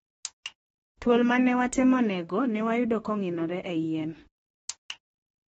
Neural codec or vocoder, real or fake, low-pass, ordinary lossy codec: autoencoder, 48 kHz, 32 numbers a frame, DAC-VAE, trained on Japanese speech; fake; 19.8 kHz; AAC, 24 kbps